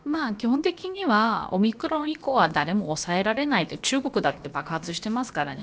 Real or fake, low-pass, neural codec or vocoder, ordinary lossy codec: fake; none; codec, 16 kHz, about 1 kbps, DyCAST, with the encoder's durations; none